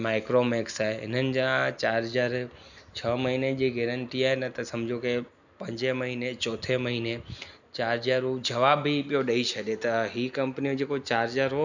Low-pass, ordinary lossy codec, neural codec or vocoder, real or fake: 7.2 kHz; none; none; real